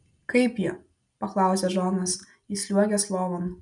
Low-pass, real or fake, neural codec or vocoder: 10.8 kHz; real; none